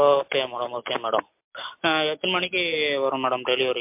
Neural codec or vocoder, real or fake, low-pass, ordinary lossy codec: none; real; 3.6 kHz; MP3, 32 kbps